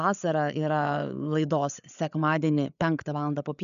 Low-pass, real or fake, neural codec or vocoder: 7.2 kHz; fake; codec, 16 kHz, 8 kbps, FreqCodec, larger model